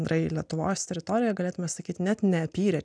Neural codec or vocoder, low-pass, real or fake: none; 9.9 kHz; real